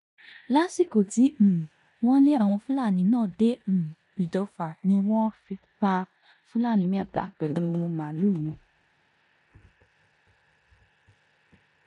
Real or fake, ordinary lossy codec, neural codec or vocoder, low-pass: fake; none; codec, 16 kHz in and 24 kHz out, 0.9 kbps, LongCat-Audio-Codec, four codebook decoder; 10.8 kHz